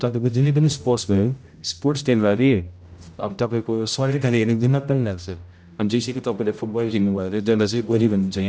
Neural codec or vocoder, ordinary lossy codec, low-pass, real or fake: codec, 16 kHz, 0.5 kbps, X-Codec, HuBERT features, trained on general audio; none; none; fake